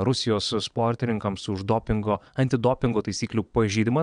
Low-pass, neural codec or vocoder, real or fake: 9.9 kHz; vocoder, 22.05 kHz, 80 mel bands, WaveNeXt; fake